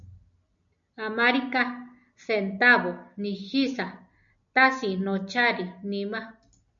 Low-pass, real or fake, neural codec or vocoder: 7.2 kHz; real; none